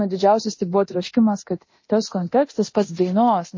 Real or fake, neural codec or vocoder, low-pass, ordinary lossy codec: fake; codec, 24 kHz, 0.9 kbps, DualCodec; 7.2 kHz; MP3, 32 kbps